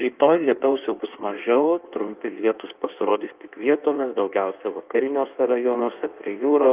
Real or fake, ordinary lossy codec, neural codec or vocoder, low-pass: fake; Opus, 24 kbps; codec, 16 kHz in and 24 kHz out, 1.1 kbps, FireRedTTS-2 codec; 3.6 kHz